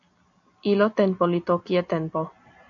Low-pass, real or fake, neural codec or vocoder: 7.2 kHz; real; none